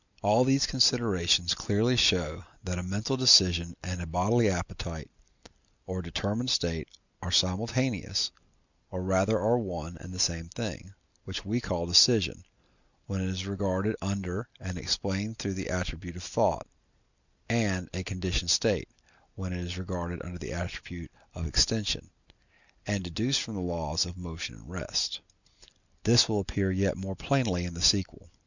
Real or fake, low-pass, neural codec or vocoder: real; 7.2 kHz; none